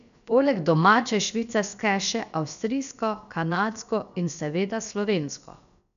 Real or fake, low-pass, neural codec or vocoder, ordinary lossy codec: fake; 7.2 kHz; codec, 16 kHz, about 1 kbps, DyCAST, with the encoder's durations; none